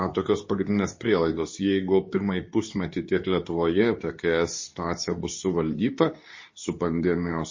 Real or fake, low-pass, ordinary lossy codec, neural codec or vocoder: fake; 7.2 kHz; MP3, 32 kbps; codec, 24 kHz, 0.9 kbps, WavTokenizer, medium speech release version 2